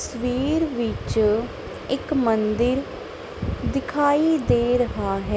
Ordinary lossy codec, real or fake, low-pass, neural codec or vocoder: none; real; none; none